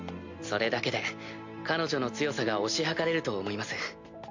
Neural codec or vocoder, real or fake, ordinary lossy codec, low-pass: none; real; MP3, 48 kbps; 7.2 kHz